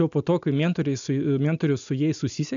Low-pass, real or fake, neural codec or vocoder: 7.2 kHz; real; none